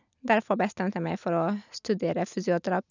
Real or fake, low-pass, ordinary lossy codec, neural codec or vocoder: real; 7.2 kHz; none; none